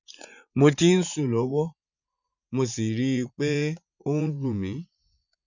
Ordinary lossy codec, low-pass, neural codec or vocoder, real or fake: none; 7.2 kHz; vocoder, 44.1 kHz, 80 mel bands, Vocos; fake